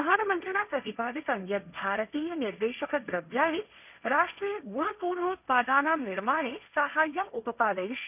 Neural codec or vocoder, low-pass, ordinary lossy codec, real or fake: codec, 16 kHz, 1.1 kbps, Voila-Tokenizer; 3.6 kHz; none; fake